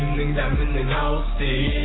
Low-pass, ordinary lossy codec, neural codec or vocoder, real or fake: 7.2 kHz; AAC, 16 kbps; codec, 16 kHz, 6 kbps, DAC; fake